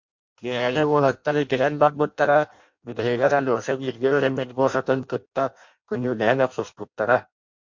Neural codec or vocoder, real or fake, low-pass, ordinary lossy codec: codec, 16 kHz in and 24 kHz out, 0.6 kbps, FireRedTTS-2 codec; fake; 7.2 kHz; MP3, 48 kbps